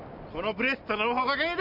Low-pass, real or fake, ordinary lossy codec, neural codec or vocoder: 5.4 kHz; real; none; none